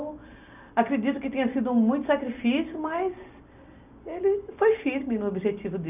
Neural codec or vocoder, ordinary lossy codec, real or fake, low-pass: none; none; real; 3.6 kHz